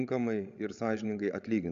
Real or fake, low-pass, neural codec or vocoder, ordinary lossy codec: fake; 7.2 kHz; codec, 16 kHz, 16 kbps, FreqCodec, larger model; Opus, 64 kbps